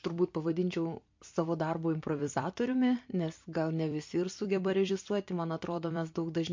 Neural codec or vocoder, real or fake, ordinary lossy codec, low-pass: vocoder, 44.1 kHz, 128 mel bands every 256 samples, BigVGAN v2; fake; MP3, 48 kbps; 7.2 kHz